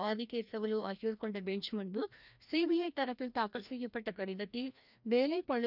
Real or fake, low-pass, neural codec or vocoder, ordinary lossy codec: fake; 5.4 kHz; codec, 16 kHz, 1 kbps, FreqCodec, larger model; none